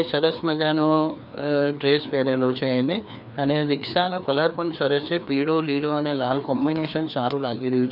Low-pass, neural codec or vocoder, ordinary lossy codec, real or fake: 5.4 kHz; codec, 16 kHz, 2 kbps, FreqCodec, larger model; none; fake